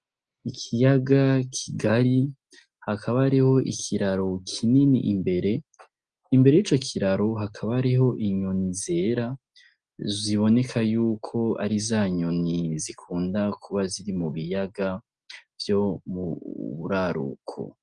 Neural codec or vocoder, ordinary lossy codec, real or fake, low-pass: none; Opus, 24 kbps; real; 9.9 kHz